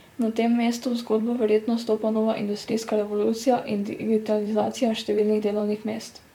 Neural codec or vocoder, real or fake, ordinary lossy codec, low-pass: vocoder, 44.1 kHz, 128 mel bands, Pupu-Vocoder; fake; MP3, 96 kbps; 19.8 kHz